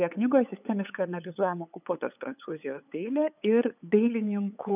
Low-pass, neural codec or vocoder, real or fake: 3.6 kHz; codec, 16 kHz, 8 kbps, FunCodec, trained on LibriTTS, 25 frames a second; fake